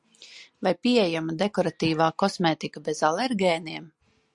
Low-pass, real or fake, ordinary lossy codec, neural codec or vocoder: 10.8 kHz; real; Opus, 64 kbps; none